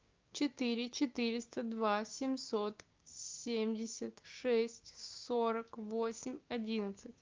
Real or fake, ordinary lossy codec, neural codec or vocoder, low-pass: fake; Opus, 24 kbps; codec, 16 kHz, 6 kbps, DAC; 7.2 kHz